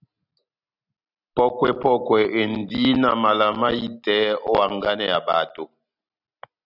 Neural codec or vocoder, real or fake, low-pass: none; real; 5.4 kHz